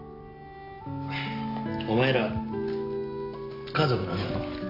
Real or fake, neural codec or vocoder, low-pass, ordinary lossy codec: real; none; 5.4 kHz; AAC, 32 kbps